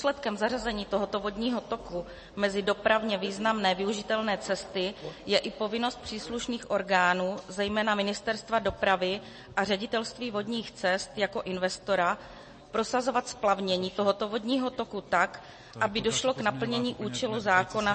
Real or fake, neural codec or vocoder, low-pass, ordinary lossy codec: real; none; 10.8 kHz; MP3, 32 kbps